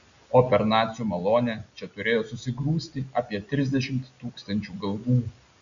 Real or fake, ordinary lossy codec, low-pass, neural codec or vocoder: real; AAC, 96 kbps; 7.2 kHz; none